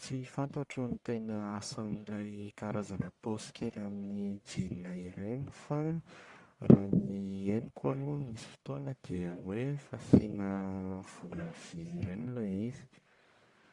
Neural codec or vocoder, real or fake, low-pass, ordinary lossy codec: codec, 44.1 kHz, 1.7 kbps, Pupu-Codec; fake; 10.8 kHz; Opus, 64 kbps